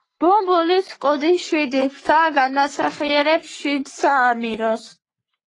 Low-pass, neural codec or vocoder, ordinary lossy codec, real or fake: 10.8 kHz; codec, 44.1 kHz, 3.4 kbps, Pupu-Codec; AAC, 32 kbps; fake